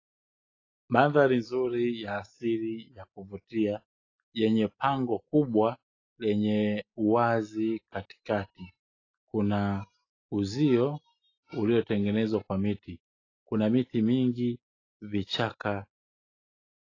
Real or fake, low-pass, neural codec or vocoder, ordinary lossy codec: real; 7.2 kHz; none; AAC, 32 kbps